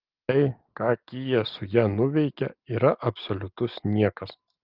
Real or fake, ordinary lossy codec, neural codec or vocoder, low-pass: real; Opus, 32 kbps; none; 5.4 kHz